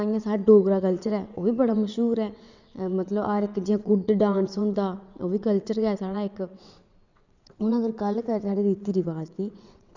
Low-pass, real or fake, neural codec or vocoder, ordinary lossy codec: 7.2 kHz; fake; vocoder, 22.05 kHz, 80 mel bands, WaveNeXt; none